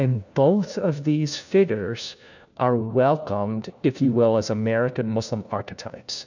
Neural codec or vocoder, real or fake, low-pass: codec, 16 kHz, 1 kbps, FunCodec, trained on LibriTTS, 50 frames a second; fake; 7.2 kHz